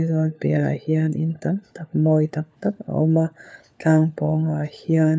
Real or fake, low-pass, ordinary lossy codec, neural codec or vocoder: fake; none; none; codec, 16 kHz, 4 kbps, FunCodec, trained on LibriTTS, 50 frames a second